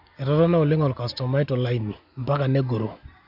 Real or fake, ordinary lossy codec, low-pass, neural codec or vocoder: real; none; 5.4 kHz; none